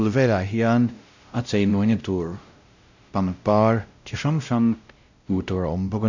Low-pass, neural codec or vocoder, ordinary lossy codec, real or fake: 7.2 kHz; codec, 16 kHz, 0.5 kbps, X-Codec, WavLM features, trained on Multilingual LibriSpeech; none; fake